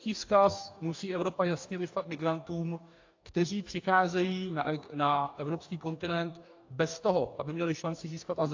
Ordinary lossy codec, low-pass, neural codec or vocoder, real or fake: AAC, 48 kbps; 7.2 kHz; codec, 44.1 kHz, 2.6 kbps, DAC; fake